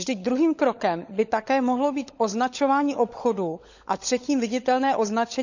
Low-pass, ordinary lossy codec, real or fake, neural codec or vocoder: 7.2 kHz; none; fake; codec, 16 kHz, 16 kbps, FunCodec, trained on LibriTTS, 50 frames a second